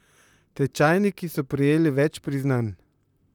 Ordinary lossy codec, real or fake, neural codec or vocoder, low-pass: none; fake; vocoder, 44.1 kHz, 128 mel bands, Pupu-Vocoder; 19.8 kHz